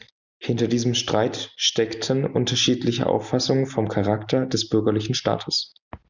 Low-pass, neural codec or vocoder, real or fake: 7.2 kHz; none; real